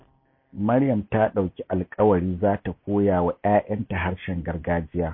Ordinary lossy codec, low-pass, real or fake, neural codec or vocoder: MP3, 24 kbps; 5.4 kHz; real; none